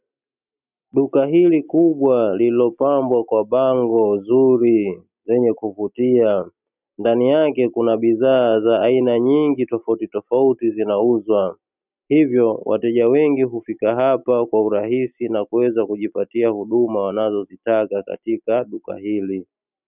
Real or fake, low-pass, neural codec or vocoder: real; 3.6 kHz; none